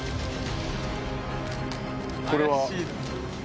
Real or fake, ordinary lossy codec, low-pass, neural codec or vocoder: real; none; none; none